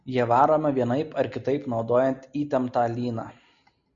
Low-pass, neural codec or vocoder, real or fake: 7.2 kHz; none; real